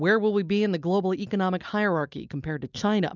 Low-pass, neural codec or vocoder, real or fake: 7.2 kHz; none; real